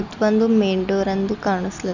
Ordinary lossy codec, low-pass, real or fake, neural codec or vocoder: none; 7.2 kHz; real; none